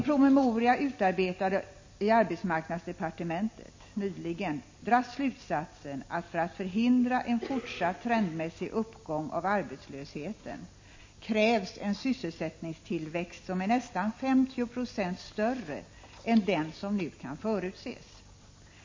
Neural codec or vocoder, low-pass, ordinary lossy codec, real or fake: none; 7.2 kHz; MP3, 32 kbps; real